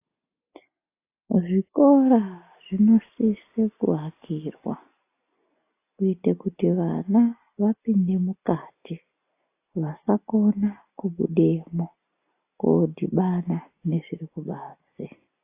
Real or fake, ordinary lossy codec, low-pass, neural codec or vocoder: real; AAC, 24 kbps; 3.6 kHz; none